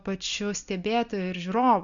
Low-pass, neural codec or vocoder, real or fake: 7.2 kHz; none; real